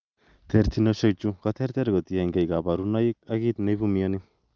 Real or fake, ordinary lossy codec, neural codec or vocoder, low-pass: real; Opus, 32 kbps; none; 7.2 kHz